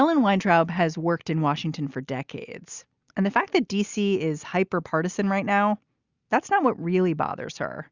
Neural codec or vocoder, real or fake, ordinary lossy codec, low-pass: none; real; Opus, 64 kbps; 7.2 kHz